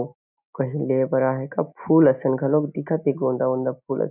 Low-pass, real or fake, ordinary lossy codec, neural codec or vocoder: 3.6 kHz; real; none; none